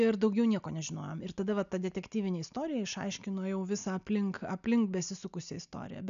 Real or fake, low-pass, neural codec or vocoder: real; 7.2 kHz; none